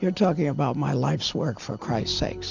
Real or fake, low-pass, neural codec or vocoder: real; 7.2 kHz; none